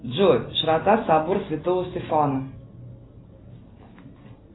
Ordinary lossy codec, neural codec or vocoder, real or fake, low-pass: AAC, 16 kbps; none; real; 7.2 kHz